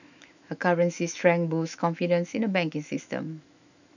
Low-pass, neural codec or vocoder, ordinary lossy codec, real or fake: 7.2 kHz; autoencoder, 48 kHz, 128 numbers a frame, DAC-VAE, trained on Japanese speech; none; fake